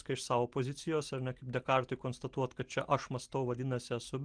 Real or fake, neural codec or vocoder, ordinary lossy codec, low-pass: real; none; Opus, 24 kbps; 9.9 kHz